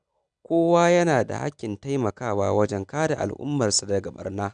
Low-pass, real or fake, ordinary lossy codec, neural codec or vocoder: 9.9 kHz; real; none; none